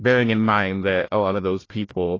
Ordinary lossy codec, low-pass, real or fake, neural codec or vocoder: AAC, 32 kbps; 7.2 kHz; fake; codec, 16 kHz, 1 kbps, FunCodec, trained on Chinese and English, 50 frames a second